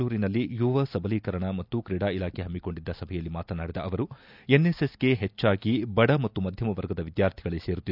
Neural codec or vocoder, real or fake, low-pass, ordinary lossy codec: none; real; 5.4 kHz; none